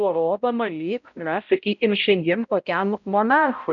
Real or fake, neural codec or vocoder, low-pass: fake; codec, 16 kHz, 0.5 kbps, X-Codec, HuBERT features, trained on balanced general audio; 7.2 kHz